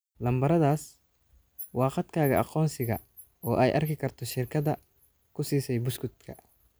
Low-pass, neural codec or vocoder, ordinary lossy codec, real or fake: none; none; none; real